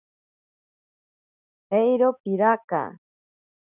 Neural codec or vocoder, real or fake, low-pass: none; real; 3.6 kHz